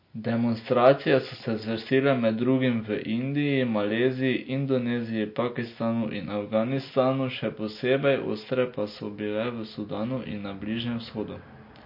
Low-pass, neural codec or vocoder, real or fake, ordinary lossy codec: 5.4 kHz; none; real; MP3, 32 kbps